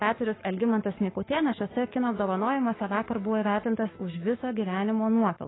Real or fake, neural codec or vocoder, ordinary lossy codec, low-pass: fake; codec, 44.1 kHz, 7.8 kbps, DAC; AAC, 16 kbps; 7.2 kHz